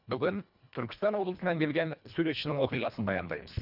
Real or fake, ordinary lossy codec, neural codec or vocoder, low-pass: fake; none; codec, 24 kHz, 1.5 kbps, HILCodec; 5.4 kHz